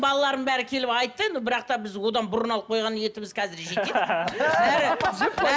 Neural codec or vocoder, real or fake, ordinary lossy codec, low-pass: none; real; none; none